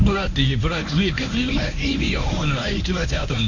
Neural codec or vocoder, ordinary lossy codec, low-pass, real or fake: codec, 24 kHz, 0.9 kbps, WavTokenizer, medium speech release version 1; none; 7.2 kHz; fake